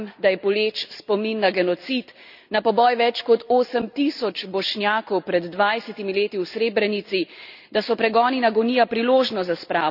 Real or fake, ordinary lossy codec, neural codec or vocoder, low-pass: real; none; none; 5.4 kHz